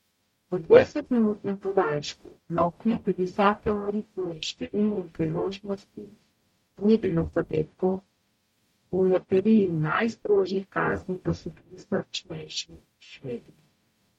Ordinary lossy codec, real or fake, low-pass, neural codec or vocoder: MP3, 64 kbps; fake; 19.8 kHz; codec, 44.1 kHz, 0.9 kbps, DAC